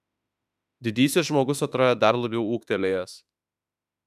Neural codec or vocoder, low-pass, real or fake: autoencoder, 48 kHz, 32 numbers a frame, DAC-VAE, trained on Japanese speech; 14.4 kHz; fake